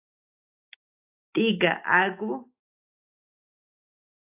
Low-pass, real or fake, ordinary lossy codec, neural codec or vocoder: 3.6 kHz; real; AAC, 24 kbps; none